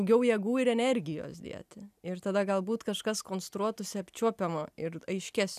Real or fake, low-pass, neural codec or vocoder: real; 14.4 kHz; none